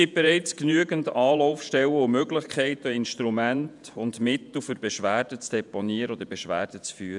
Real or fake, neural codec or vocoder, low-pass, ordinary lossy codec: fake; vocoder, 48 kHz, 128 mel bands, Vocos; 10.8 kHz; none